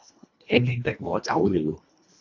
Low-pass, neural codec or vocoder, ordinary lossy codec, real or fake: 7.2 kHz; codec, 24 kHz, 1.5 kbps, HILCodec; AAC, 48 kbps; fake